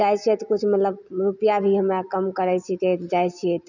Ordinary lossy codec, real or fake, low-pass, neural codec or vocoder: none; real; 7.2 kHz; none